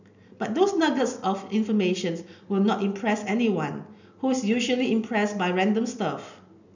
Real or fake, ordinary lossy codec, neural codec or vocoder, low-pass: real; none; none; 7.2 kHz